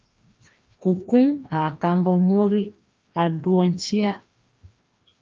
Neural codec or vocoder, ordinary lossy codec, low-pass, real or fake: codec, 16 kHz, 1 kbps, FreqCodec, larger model; Opus, 24 kbps; 7.2 kHz; fake